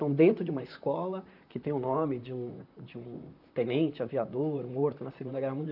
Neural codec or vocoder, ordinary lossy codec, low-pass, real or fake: vocoder, 44.1 kHz, 128 mel bands, Pupu-Vocoder; none; 5.4 kHz; fake